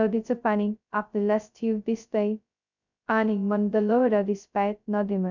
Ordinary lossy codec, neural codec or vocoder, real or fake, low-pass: none; codec, 16 kHz, 0.2 kbps, FocalCodec; fake; 7.2 kHz